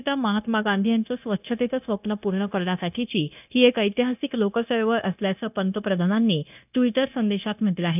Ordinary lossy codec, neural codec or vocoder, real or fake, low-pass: none; codec, 16 kHz, 0.9 kbps, LongCat-Audio-Codec; fake; 3.6 kHz